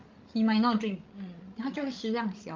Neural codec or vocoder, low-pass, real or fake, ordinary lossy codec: codec, 16 kHz, 16 kbps, FreqCodec, larger model; 7.2 kHz; fake; Opus, 32 kbps